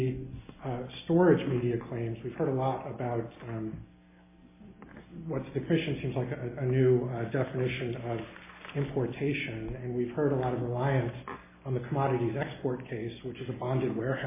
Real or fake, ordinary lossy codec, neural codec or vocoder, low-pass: real; MP3, 16 kbps; none; 3.6 kHz